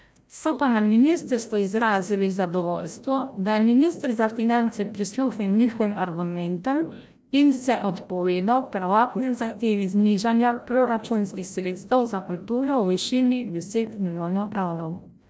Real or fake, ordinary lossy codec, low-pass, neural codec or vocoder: fake; none; none; codec, 16 kHz, 0.5 kbps, FreqCodec, larger model